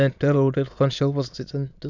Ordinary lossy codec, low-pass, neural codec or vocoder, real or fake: none; 7.2 kHz; autoencoder, 22.05 kHz, a latent of 192 numbers a frame, VITS, trained on many speakers; fake